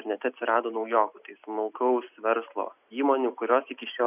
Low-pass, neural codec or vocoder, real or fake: 3.6 kHz; none; real